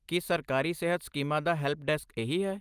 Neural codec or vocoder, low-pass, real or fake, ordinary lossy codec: none; 14.4 kHz; real; Opus, 32 kbps